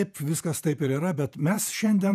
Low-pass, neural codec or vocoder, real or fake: 14.4 kHz; vocoder, 48 kHz, 128 mel bands, Vocos; fake